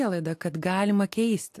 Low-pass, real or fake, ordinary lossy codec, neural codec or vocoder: 14.4 kHz; real; AAC, 64 kbps; none